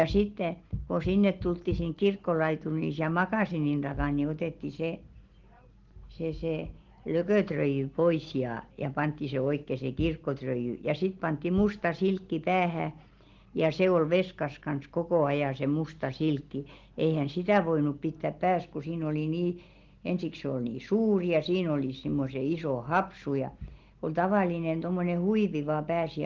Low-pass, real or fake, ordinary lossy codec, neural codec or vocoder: 7.2 kHz; real; Opus, 16 kbps; none